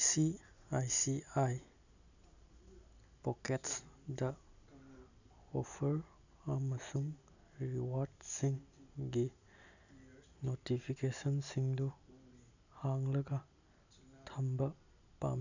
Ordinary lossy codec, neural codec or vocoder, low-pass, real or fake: none; none; 7.2 kHz; real